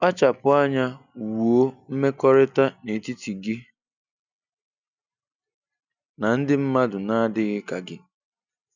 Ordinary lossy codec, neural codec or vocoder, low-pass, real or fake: none; none; 7.2 kHz; real